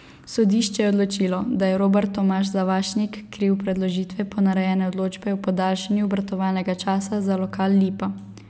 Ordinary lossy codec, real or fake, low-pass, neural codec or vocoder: none; real; none; none